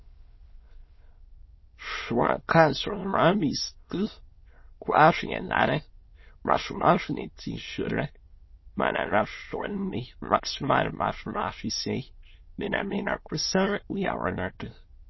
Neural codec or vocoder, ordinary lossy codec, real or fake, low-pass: autoencoder, 22.05 kHz, a latent of 192 numbers a frame, VITS, trained on many speakers; MP3, 24 kbps; fake; 7.2 kHz